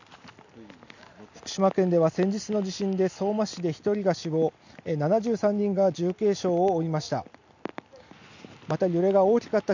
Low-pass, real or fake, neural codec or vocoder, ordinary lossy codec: 7.2 kHz; real; none; none